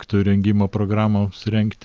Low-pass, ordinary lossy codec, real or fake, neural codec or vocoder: 7.2 kHz; Opus, 24 kbps; real; none